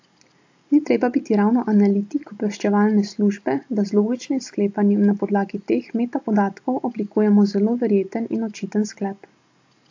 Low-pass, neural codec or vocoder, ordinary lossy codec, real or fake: 7.2 kHz; none; AAC, 48 kbps; real